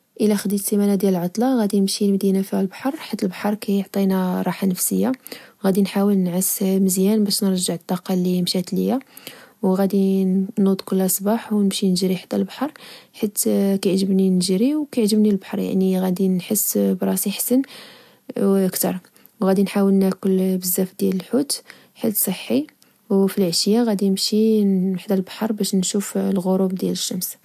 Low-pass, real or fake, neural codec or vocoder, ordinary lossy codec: 14.4 kHz; real; none; AAC, 96 kbps